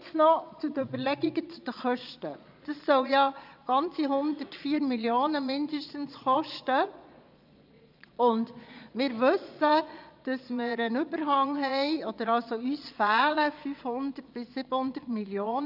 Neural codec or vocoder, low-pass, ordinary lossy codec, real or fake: vocoder, 22.05 kHz, 80 mel bands, Vocos; 5.4 kHz; none; fake